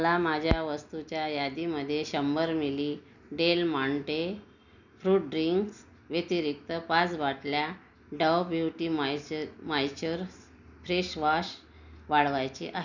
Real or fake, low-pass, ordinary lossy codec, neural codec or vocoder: real; 7.2 kHz; none; none